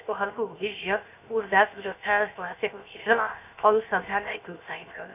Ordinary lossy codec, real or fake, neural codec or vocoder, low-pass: none; fake; codec, 16 kHz, 0.7 kbps, FocalCodec; 3.6 kHz